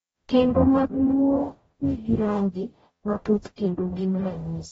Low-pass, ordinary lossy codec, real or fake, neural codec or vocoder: 19.8 kHz; AAC, 24 kbps; fake; codec, 44.1 kHz, 0.9 kbps, DAC